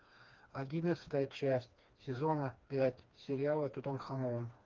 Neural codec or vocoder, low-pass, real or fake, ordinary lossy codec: codec, 16 kHz, 2 kbps, FreqCodec, smaller model; 7.2 kHz; fake; Opus, 24 kbps